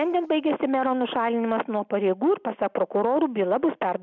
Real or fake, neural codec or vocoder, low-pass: real; none; 7.2 kHz